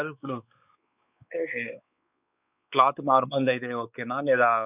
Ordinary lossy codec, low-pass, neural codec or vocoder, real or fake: none; 3.6 kHz; codec, 16 kHz, 4 kbps, X-Codec, WavLM features, trained on Multilingual LibriSpeech; fake